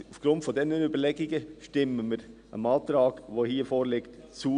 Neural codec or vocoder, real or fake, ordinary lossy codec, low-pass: none; real; none; 9.9 kHz